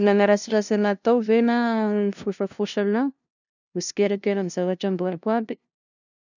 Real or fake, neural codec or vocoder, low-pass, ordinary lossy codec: fake; codec, 16 kHz, 0.5 kbps, FunCodec, trained on LibriTTS, 25 frames a second; 7.2 kHz; none